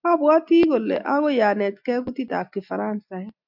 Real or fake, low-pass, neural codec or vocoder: real; 5.4 kHz; none